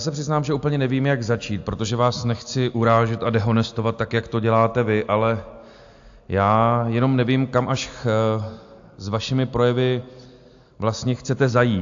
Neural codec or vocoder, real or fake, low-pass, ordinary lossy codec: none; real; 7.2 kHz; AAC, 64 kbps